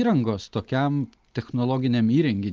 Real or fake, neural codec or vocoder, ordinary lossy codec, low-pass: real; none; Opus, 24 kbps; 7.2 kHz